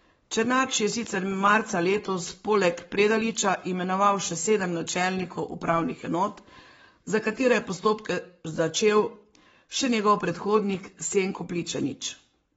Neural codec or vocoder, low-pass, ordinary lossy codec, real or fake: codec, 44.1 kHz, 7.8 kbps, Pupu-Codec; 19.8 kHz; AAC, 24 kbps; fake